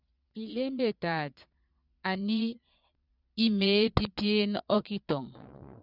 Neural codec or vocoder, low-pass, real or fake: vocoder, 22.05 kHz, 80 mel bands, Vocos; 5.4 kHz; fake